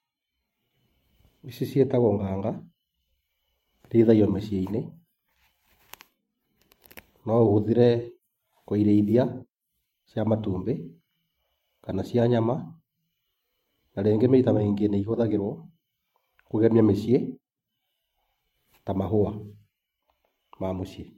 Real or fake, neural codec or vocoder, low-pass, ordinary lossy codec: fake; vocoder, 44.1 kHz, 128 mel bands every 512 samples, BigVGAN v2; 19.8 kHz; MP3, 64 kbps